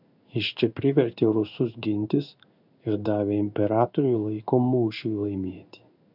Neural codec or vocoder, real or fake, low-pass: codec, 16 kHz in and 24 kHz out, 1 kbps, XY-Tokenizer; fake; 5.4 kHz